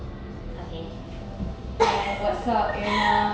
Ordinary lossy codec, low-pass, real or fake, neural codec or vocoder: none; none; real; none